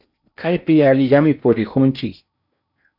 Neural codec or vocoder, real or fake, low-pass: codec, 16 kHz in and 24 kHz out, 0.8 kbps, FocalCodec, streaming, 65536 codes; fake; 5.4 kHz